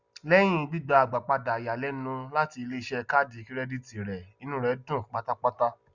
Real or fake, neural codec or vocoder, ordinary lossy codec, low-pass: real; none; Opus, 64 kbps; 7.2 kHz